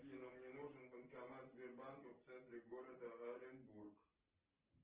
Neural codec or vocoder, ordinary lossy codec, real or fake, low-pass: none; Opus, 16 kbps; real; 3.6 kHz